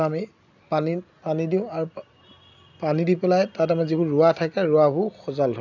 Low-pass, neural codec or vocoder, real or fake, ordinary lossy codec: 7.2 kHz; none; real; none